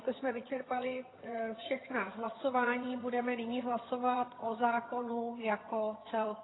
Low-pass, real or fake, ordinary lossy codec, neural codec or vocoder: 7.2 kHz; fake; AAC, 16 kbps; vocoder, 22.05 kHz, 80 mel bands, HiFi-GAN